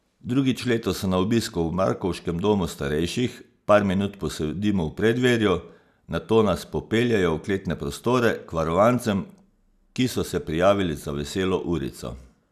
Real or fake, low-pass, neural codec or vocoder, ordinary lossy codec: real; 14.4 kHz; none; none